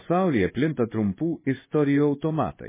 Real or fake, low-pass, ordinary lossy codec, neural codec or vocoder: fake; 3.6 kHz; MP3, 16 kbps; codec, 16 kHz in and 24 kHz out, 1 kbps, XY-Tokenizer